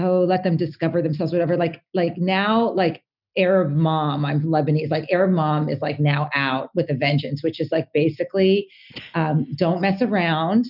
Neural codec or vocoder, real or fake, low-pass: none; real; 5.4 kHz